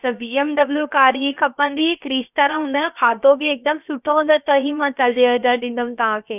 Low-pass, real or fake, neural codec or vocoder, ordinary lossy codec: 3.6 kHz; fake; codec, 16 kHz, about 1 kbps, DyCAST, with the encoder's durations; none